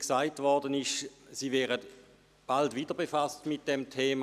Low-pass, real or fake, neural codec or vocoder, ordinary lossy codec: 14.4 kHz; real; none; none